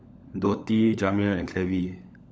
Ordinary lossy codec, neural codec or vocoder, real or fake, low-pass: none; codec, 16 kHz, 16 kbps, FunCodec, trained on LibriTTS, 50 frames a second; fake; none